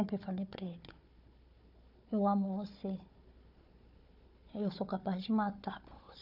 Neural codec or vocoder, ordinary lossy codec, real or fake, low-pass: codec, 16 kHz, 4 kbps, FunCodec, trained on Chinese and English, 50 frames a second; none; fake; 5.4 kHz